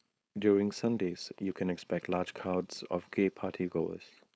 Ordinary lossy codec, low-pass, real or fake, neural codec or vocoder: none; none; fake; codec, 16 kHz, 4.8 kbps, FACodec